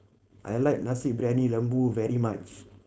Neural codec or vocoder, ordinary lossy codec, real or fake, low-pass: codec, 16 kHz, 4.8 kbps, FACodec; none; fake; none